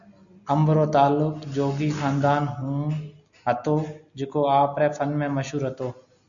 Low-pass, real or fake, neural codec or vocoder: 7.2 kHz; real; none